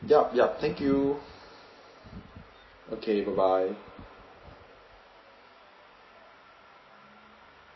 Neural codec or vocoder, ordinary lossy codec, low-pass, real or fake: none; MP3, 24 kbps; 7.2 kHz; real